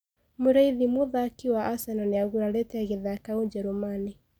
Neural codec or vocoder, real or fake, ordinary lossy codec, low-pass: none; real; none; none